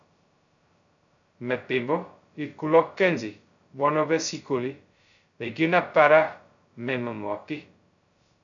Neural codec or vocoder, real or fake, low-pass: codec, 16 kHz, 0.2 kbps, FocalCodec; fake; 7.2 kHz